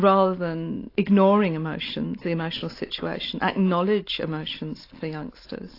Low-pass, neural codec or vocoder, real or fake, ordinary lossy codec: 5.4 kHz; none; real; AAC, 32 kbps